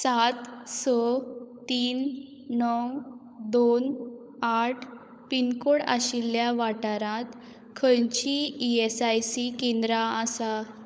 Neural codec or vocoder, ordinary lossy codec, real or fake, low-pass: codec, 16 kHz, 16 kbps, FunCodec, trained on LibriTTS, 50 frames a second; none; fake; none